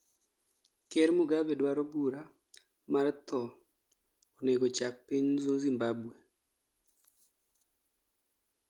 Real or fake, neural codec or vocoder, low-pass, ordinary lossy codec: real; none; 19.8 kHz; Opus, 32 kbps